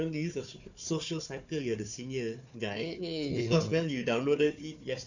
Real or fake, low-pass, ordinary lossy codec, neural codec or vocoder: fake; 7.2 kHz; none; codec, 16 kHz, 4 kbps, FunCodec, trained on Chinese and English, 50 frames a second